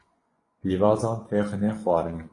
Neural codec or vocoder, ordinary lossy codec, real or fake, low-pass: vocoder, 24 kHz, 100 mel bands, Vocos; AAC, 32 kbps; fake; 10.8 kHz